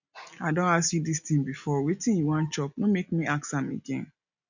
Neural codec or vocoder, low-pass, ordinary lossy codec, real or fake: none; 7.2 kHz; none; real